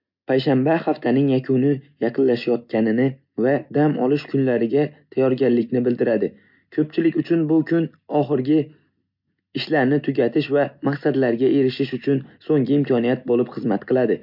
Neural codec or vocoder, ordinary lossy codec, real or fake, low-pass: none; MP3, 48 kbps; real; 5.4 kHz